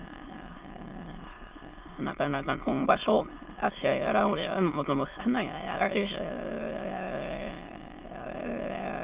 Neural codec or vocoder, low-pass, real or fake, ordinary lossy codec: autoencoder, 22.05 kHz, a latent of 192 numbers a frame, VITS, trained on many speakers; 3.6 kHz; fake; Opus, 32 kbps